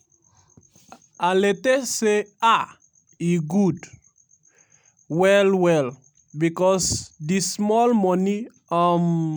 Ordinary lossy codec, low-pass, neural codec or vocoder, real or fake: none; none; none; real